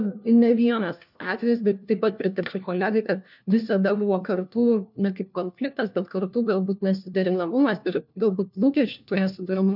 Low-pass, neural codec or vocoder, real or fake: 5.4 kHz; codec, 16 kHz, 1 kbps, FunCodec, trained on LibriTTS, 50 frames a second; fake